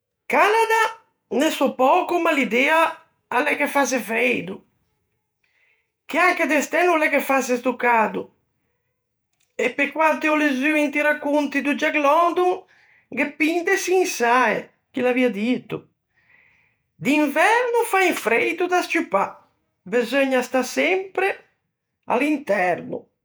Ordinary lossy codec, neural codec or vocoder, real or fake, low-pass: none; vocoder, 48 kHz, 128 mel bands, Vocos; fake; none